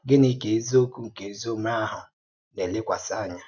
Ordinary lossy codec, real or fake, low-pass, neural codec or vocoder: none; real; 7.2 kHz; none